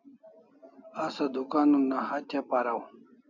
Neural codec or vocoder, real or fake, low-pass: none; real; 7.2 kHz